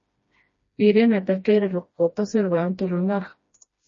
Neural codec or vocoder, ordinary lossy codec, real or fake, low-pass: codec, 16 kHz, 1 kbps, FreqCodec, smaller model; MP3, 32 kbps; fake; 7.2 kHz